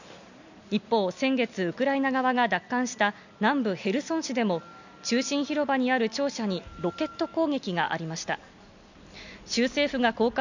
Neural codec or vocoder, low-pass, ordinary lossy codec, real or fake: none; 7.2 kHz; none; real